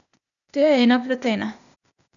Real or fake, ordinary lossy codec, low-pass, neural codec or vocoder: fake; none; 7.2 kHz; codec, 16 kHz, 0.8 kbps, ZipCodec